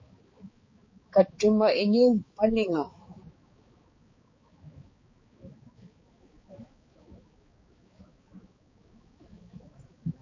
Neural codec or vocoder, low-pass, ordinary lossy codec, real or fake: codec, 16 kHz, 4 kbps, X-Codec, HuBERT features, trained on balanced general audio; 7.2 kHz; MP3, 32 kbps; fake